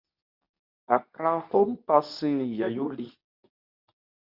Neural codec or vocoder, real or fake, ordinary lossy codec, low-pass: codec, 24 kHz, 0.9 kbps, WavTokenizer, medium speech release version 2; fake; Opus, 64 kbps; 5.4 kHz